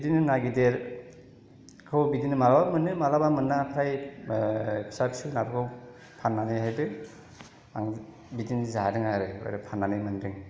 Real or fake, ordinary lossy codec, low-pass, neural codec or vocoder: real; none; none; none